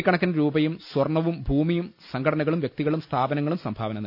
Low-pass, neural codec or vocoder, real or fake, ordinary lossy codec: 5.4 kHz; none; real; none